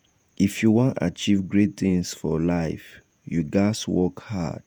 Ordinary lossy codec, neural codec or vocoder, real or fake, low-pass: none; vocoder, 48 kHz, 128 mel bands, Vocos; fake; none